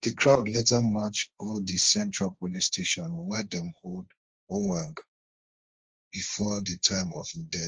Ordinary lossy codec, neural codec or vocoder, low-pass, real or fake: Opus, 24 kbps; codec, 16 kHz, 1.1 kbps, Voila-Tokenizer; 7.2 kHz; fake